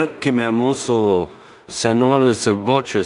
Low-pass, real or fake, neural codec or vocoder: 10.8 kHz; fake; codec, 16 kHz in and 24 kHz out, 0.4 kbps, LongCat-Audio-Codec, two codebook decoder